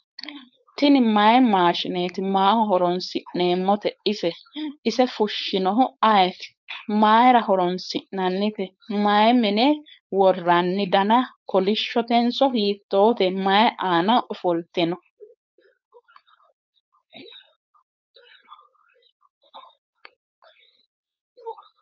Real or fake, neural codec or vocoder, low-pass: fake; codec, 16 kHz, 4.8 kbps, FACodec; 7.2 kHz